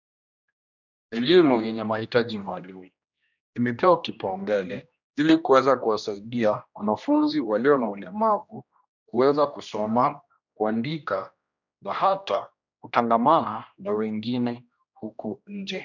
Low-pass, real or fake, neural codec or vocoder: 7.2 kHz; fake; codec, 16 kHz, 1 kbps, X-Codec, HuBERT features, trained on general audio